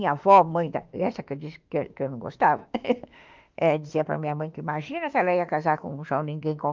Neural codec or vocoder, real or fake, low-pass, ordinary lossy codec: autoencoder, 48 kHz, 32 numbers a frame, DAC-VAE, trained on Japanese speech; fake; 7.2 kHz; Opus, 32 kbps